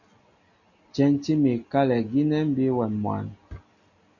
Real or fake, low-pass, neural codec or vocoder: real; 7.2 kHz; none